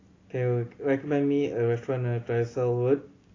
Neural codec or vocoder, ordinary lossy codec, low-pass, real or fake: none; AAC, 32 kbps; 7.2 kHz; real